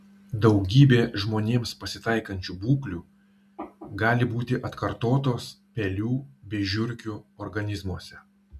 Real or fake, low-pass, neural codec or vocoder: real; 14.4 kHz; none